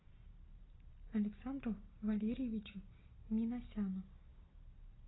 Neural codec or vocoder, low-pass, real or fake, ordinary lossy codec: none; 7.2 kHz; real; AAC, 16 kbps